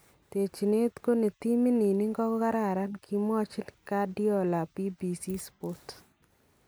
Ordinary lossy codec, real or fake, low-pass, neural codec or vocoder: none; real; none; none